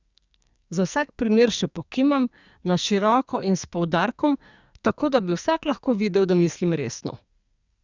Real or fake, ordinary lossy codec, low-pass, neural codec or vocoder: fake; Opus, 64 kbps; 7.2 kHz; codec, 44.1 kHz, 2.6 kbps, SNAC